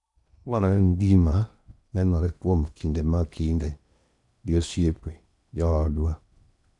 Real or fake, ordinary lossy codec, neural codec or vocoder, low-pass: fake; none; codec, 16 kHz in and 24 kHz out, 0.8 kbps, FocalCodec, streaming, 65536 codes; 10.8 kHz